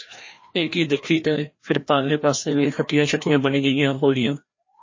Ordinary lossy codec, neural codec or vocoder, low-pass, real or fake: MP3, 32 kbps; codec, 16 kHz, 1 kbps, FreqCodec, larger model; 7.2 kHz; fake